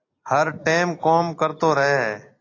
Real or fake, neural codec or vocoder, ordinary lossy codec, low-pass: real; none; AAC, 32 kbps; 7.2 kHz